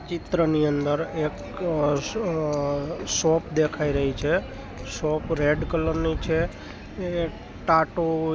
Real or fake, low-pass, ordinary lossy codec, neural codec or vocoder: real; none; none; none